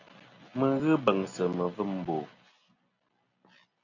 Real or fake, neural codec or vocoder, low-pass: real; none; 7.2 kHz